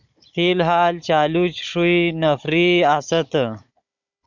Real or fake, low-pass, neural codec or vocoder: fake; 7.2 kHz; codec, 16 kHz, 16 kbps, FunCodec, trained on Chinese and English, 50 frames a second